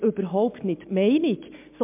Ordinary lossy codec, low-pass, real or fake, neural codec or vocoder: MP3, 32 kbps; 3.6 kHz; real; none